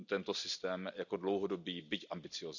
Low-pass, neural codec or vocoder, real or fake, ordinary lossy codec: 7.2 kHz; none; real; none